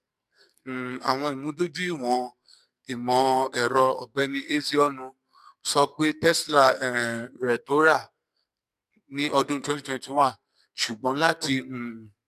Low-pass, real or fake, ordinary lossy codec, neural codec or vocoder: 14.4 kHz; fake; MP3, 96 kbps; codec, 44.1 kHz, 2.6 kbps, SNAC